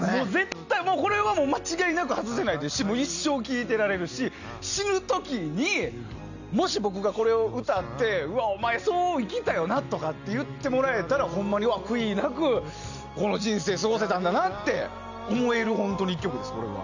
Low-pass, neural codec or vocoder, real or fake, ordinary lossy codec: 7.2 kHz; none; real; none